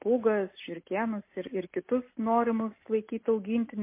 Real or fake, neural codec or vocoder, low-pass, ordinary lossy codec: real; none; 3.6 kHz; MP3, 24 kbps